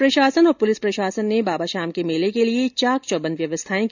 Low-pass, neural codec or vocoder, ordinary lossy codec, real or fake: 7.2 kHz; none; none; real